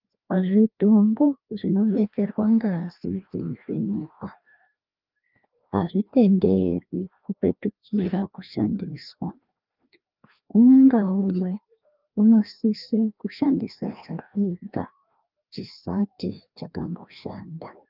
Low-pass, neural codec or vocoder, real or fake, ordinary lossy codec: 5.4 kHz; codec, 16 kHz, 1 kbps, FreqCodec, larger model; fake; Opus, 32 kbps